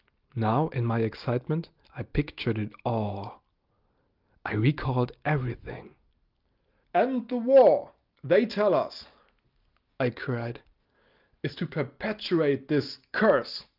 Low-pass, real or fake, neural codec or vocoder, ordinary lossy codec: 5.4 kHz; real; none; Opus, 32 kbps